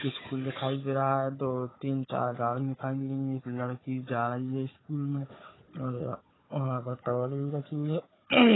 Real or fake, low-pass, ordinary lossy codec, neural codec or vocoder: fake; 7.2 kHz; AAC, 16 kbps; codec, 16 kHz, 4 kbps, FunCodec, trained on Chinese and English, 50 frames a second